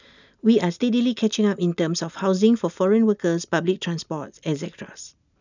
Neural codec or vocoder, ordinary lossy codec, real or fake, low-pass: none; none; real; 7.2 kHz